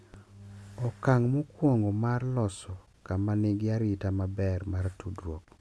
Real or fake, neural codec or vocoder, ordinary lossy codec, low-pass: real; none; none; none